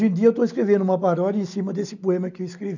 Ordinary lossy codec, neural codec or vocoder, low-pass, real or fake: none; none; 7.2 kHz; real